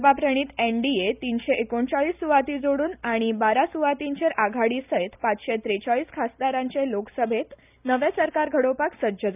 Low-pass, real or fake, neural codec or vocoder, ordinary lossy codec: 3.6 kHz; real; none; none